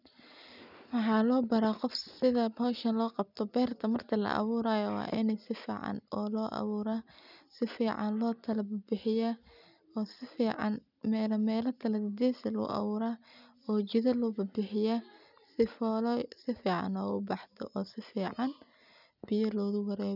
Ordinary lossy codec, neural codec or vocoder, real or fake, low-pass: none; none; real; 5.4 kHz